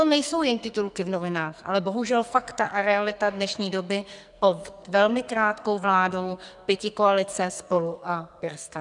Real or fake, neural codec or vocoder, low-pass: fake; codec, 44.1 kHz, 2.6 kbps, SNAC; 10.8 kHz